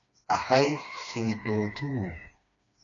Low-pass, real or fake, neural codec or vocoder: 7.2 kHz; fake; codec, 16 kHz, 2 kbps, FreqCodec, smaller model